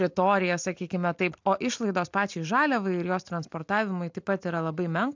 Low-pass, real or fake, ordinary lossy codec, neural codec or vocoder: 7.2 kHz; real; MP3, 64 kbps; none